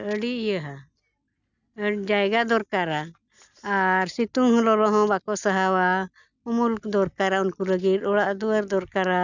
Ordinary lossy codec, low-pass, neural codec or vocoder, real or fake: none; 7.2 kHz; none; real